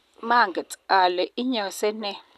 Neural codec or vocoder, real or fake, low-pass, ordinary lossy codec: none; real; 14.4 kHz; none